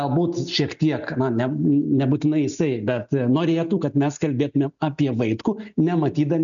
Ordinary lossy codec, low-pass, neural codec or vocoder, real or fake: MP3, 96 kbps; 7.2 kHz; none; real